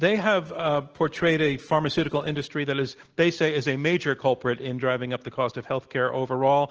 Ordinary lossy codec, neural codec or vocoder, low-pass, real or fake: Opus, 16 kbps; none; 7.2 kHz; real